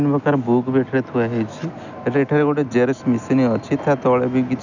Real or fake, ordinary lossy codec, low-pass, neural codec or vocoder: real; none; 7.2 kHz; none